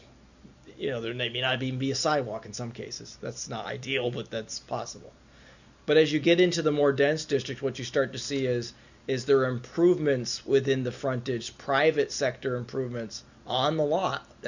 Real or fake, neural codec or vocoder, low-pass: real; none; 7.2 kHz